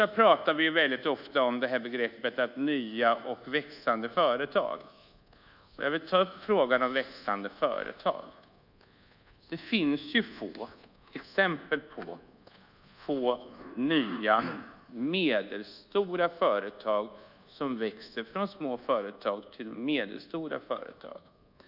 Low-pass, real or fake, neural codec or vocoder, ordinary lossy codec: 5.4 kHz; fake; codec, 24 kHz, 1.2 kbps, DualCodec; none